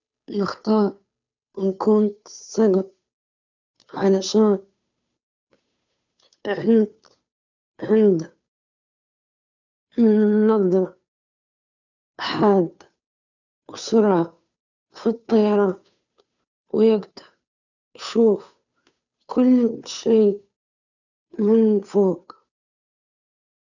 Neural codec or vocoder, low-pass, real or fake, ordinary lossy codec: codec, 16 kHz, 2 kbps, FunCodec, trained on Chinese and English, 25 frames a second; 7.2 kHz; fake; none